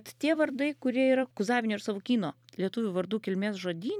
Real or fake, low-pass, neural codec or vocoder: fake; 19.8 kHz; vocoder, 44.1 kHz, 128 mel bands every 256 samples, BigVGAN v2